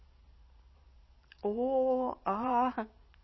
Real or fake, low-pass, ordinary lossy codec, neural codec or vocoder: fake; 7.2 kHz; MP3, 24 kbps; vocoder, 22.05 kHz, 80 mel bands, Vocos